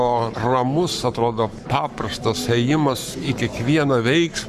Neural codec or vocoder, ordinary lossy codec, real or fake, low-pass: codec, 44.1 kHz, 7.8 kbps, Pupu-Codec; Opus, 64 kbps; fake; 14.4 kHz